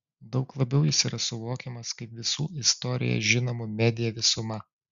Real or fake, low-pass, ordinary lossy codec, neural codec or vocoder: real; 7.2 kHz; Opus, 64 kbps; none